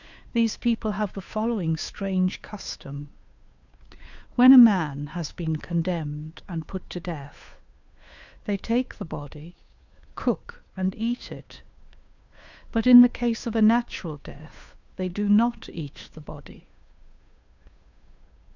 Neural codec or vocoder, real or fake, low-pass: codec, 16 kHz, 2 kbps, FunCodec, trained on Chinese and English, 25 frames a second; fake; 7.2 kHz